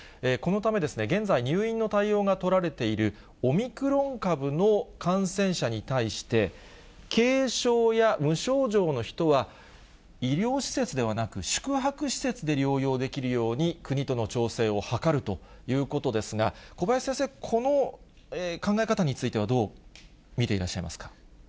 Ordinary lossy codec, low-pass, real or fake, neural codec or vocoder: none; none; real; none